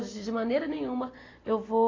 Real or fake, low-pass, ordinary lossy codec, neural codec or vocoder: real; 7.2 kHz; AAC, 32 kbps; none